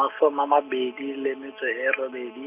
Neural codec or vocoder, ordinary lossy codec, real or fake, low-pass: none; none; real; 3.6 kHz